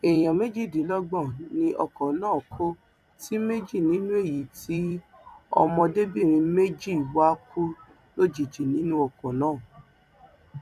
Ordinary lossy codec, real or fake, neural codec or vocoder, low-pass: none; fake; vocoder, 44.1 kHz, 128 mel bands every 256 samples, BigVGAN v2; 14.4 kHz